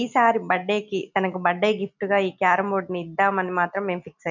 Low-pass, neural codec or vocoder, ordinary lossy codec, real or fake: 7.2 kHz; none; none; real